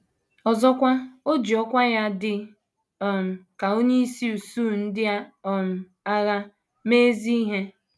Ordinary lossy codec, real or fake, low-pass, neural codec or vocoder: none; real; none; none